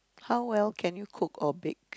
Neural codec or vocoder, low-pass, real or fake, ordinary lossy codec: none; none; real; none